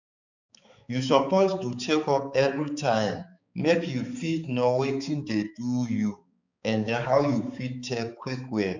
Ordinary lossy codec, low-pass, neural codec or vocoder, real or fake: none; 7.2 kHz; codec, 16 kHz, 4 kbps, X-Codec, HuBERT features, trained on balanced general audio; fake